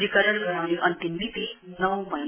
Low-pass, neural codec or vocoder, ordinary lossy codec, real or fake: 3.6 kHz; none; MP3, 16 kbps; real